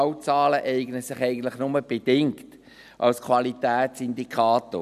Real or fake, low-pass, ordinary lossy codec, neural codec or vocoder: real; 14.4 kHz; none; none